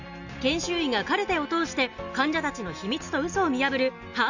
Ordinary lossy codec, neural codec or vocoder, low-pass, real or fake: none; none; 7.2 kHz; real